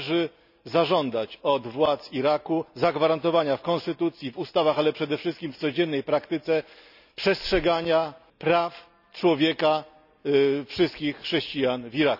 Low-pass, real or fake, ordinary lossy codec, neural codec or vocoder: 5.4 kHz; real; none; none